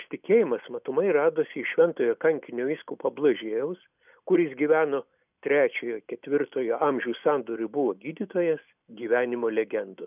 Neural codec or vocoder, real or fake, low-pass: none; real; 3.6 kHz